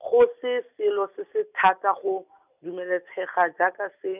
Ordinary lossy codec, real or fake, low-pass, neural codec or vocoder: none; real; 3.6 kHz; none